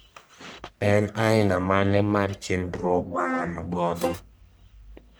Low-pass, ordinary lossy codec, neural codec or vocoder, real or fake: none; none; codec, 44.1 kHz, 1.7 kbps, Pupu-Codec; fake